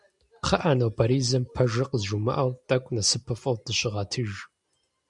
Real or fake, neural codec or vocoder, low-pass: real; none; 10.8 kHz